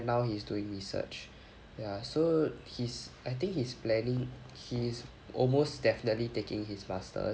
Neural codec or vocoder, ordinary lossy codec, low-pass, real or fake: none; none; none; real